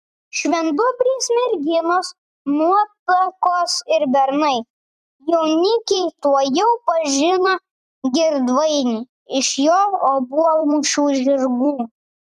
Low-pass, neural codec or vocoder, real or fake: 14.4 kHz; vocoder, 44.1 kHz, 128 mel bands every 256 samples, BigVGAN v2; fake